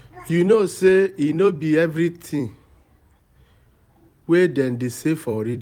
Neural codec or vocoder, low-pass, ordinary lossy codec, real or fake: vocoder, 44.1 kHz, 128 mel bands every 256 samples, BigVGAN v2; 19.8 kHz; none; fake